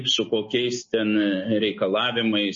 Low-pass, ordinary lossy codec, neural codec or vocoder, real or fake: 7.2 kHz; MP3, 32 kbps; none; real